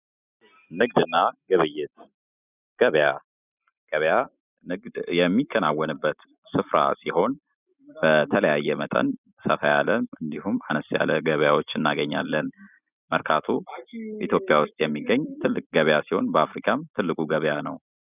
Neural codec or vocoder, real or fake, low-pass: none; real; 3.6 kHz